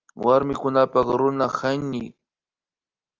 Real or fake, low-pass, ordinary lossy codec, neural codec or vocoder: real; 7.2 kHz; Opus, 32 kbps; none